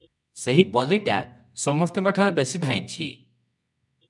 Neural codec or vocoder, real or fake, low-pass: codec, 24 kHz, 0.9 kbps, WavTokenizer, medium music audio release; fake; 10.8 kHz